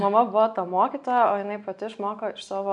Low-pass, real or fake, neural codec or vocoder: 10.8 kHz; real; none